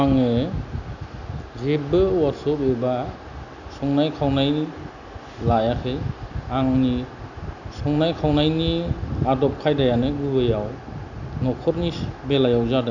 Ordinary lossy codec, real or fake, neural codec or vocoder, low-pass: none; real; none; 7.2 kHz